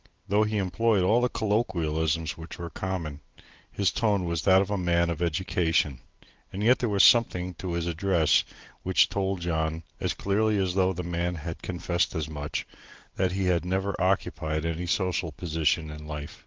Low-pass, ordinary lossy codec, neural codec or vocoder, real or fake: 7.2 kHz; Opus, 16 kbps; none; real